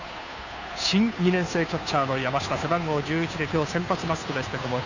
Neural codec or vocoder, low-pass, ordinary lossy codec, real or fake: codec, 16 kHz, 2 kbps, FunCodec, trained on Chinese and English, 25 frames a second; 7.2 kHz; none; fake